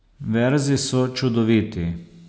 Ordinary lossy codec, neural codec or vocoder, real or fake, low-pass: none; none; real; none